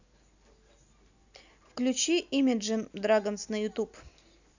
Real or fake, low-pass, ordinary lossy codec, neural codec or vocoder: real; 7.2 kHz; none; none